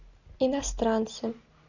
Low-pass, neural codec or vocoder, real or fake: 7.2 kHz; none; real